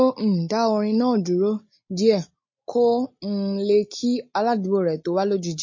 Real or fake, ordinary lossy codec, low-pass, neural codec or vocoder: real; MP3, 32 kbps; 7.2 kHz; none